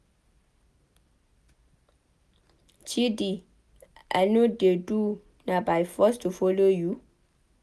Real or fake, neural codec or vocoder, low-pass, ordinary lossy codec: real; none; none; none